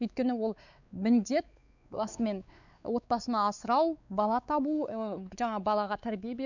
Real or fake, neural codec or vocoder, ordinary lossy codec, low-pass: fake; codec, 16 kHz, 4 kbps, X-Codec, WavLM features, trained on Multilingual LibriSpeech; none; 7.2 kHz